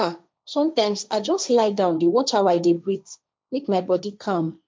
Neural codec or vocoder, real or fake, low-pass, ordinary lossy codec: codec, 16 kHz, 1.1 kbps, Voila-Tokenizer; fake; none; none